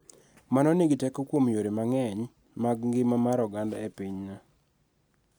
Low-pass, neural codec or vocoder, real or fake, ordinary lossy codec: none; none; real; none